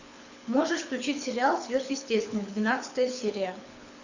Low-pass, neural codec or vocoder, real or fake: 7.2 kHz; codec, 24 kHz, 6 kbps, HILCodec; fake